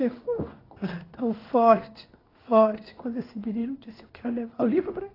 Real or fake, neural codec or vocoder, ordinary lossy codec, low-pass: fake; codec, 16 kHz in and 24 kHz out, 1 kbps, XY-Tokenizer; AAC, 24 kbps; 5.4 kHz